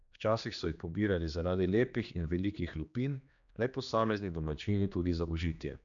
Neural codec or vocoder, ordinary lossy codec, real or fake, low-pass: codec, 16 kHz, 2 kbps, X-Codec, HuBERT features, trained on general audio; none; fake; 7.2 kHz